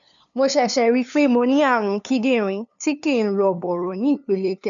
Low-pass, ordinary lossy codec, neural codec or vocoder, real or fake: 7.2 kHz; none; codec, 16 kHz, 2 kbps, FunCodec, trained on LibriTTS, 25 frames a second; fake